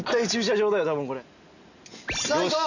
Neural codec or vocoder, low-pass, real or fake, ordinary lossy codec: none; 7.2 kHz; real; none